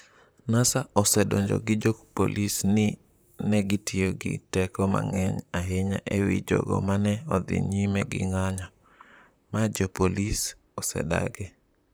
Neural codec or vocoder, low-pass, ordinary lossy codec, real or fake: vocoder, 44.1 kHz, 128 mel bands, Pupu-Vocoder; none; none; fake